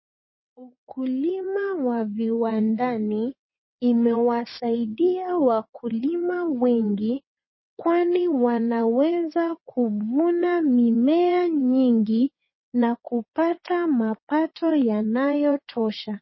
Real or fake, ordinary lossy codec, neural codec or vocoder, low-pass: fake; MP3, 24 kbps; vocoder, 22.05 kHz, 80 mel bands, WaveNeXt; 7.2 kHz